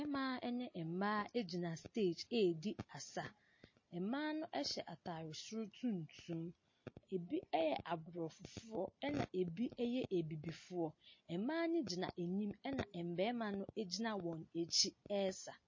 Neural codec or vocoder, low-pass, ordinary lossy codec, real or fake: none; 7.2 kHz; MP3, 32 kbps; real